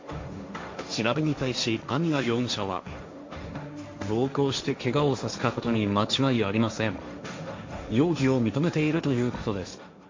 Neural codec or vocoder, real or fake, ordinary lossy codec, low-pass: codec, 16 kHz, 1.1 kbps, Voila-Tokenizer; fake; MP3, 48 kbps; 7.2 kHz